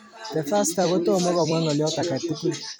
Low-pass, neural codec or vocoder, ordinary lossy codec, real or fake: none; vocoder, 44.1 kHz, 128 mel bands every 256 samples, BigVGAN v2; none; fake